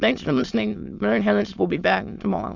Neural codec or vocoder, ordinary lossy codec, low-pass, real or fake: autoencoder, 22.05 kHz, a latent of 192 numbers a frame, VITS, trained on many speakers; Opus, 64 kbps; 7.2 kHz; fake